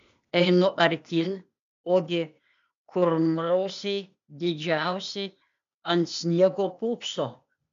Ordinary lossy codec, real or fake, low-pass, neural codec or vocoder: MP3, 64 kbps; fake; 7.2 kHz; codec, 16 kHz, 0.8 kbps, ZipCodec